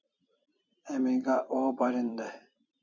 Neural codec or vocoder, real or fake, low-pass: vocoder, 44.1 kHz, 128 mel bands every 256 samples, BigVGAN v2; fake; 7.2 kHz